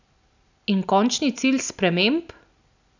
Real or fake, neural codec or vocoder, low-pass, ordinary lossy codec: real; none; 7.2 kHz; none